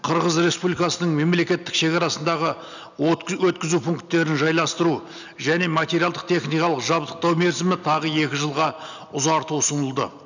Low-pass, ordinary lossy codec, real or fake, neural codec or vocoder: 7.2 kHz; none; real; none